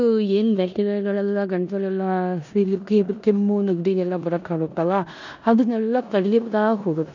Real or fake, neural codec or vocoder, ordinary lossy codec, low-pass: fake; codec, 16 kHz in and 24 kHz out, 0.9 kbps, LongCat-Audio-Codec, four codebook decoder; none; 7.2 kHz